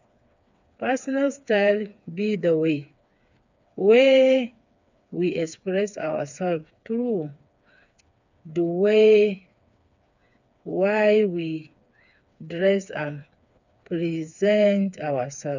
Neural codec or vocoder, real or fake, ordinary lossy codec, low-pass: codec, 16 kHz, 4 kbps, FreqCodec, smaller model; fake; none; 7.2 kHz